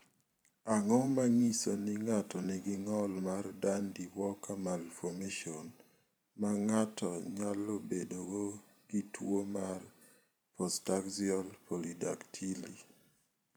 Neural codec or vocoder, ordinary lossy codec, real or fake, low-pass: vocoder, 44.1 kHz, 128 mel bands every 512 samples, BigVGAN v2; none; fake; none